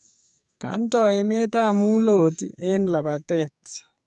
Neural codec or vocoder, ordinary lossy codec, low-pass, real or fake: codec, 44.1 kHz, 2.6 kbps, SNAC; none; 10.8 kHz; fake